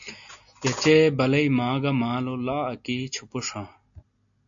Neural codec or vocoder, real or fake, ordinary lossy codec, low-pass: none; real; AAC, 64 kbps; 7.2 kHz